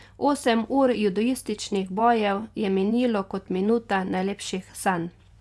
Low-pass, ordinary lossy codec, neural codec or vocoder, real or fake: none; none; vocoder, 24 kHz, 100 mel bands, Vocos; fake